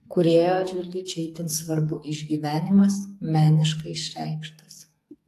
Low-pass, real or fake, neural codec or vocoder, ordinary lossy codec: 14.4 kHz; fake; codec, 44.1 kHz, 2.6 kbps, SNAC; AAC, 64 kbps